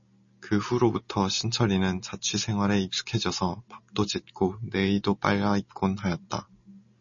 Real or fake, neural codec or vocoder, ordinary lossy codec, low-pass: real; none; MP3, 32 kbps; 7.2 kHz